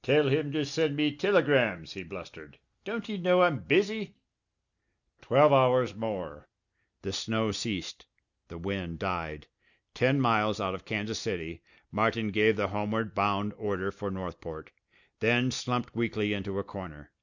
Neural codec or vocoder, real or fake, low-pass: none; real; 7.2 kHz